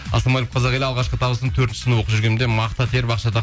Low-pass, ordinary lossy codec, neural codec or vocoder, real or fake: none; none; none; real